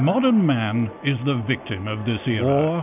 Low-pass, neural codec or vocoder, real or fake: 3.6 kHz; none; real